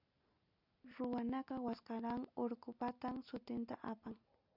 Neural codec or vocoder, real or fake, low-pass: none; real; 5.4 kHz